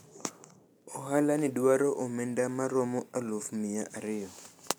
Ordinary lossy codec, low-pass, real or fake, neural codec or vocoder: none; none; real; none